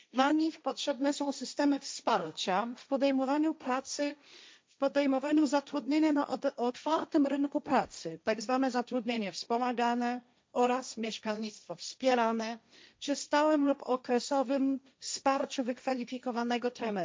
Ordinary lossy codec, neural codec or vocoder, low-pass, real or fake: none; codec, 16 kHz, 1.1 kbps, Voila-Tokenizer; none; fake